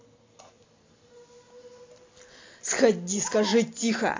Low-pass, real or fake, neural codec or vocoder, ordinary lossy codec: 7.2 kHz; real; none; AAC, 32 kbps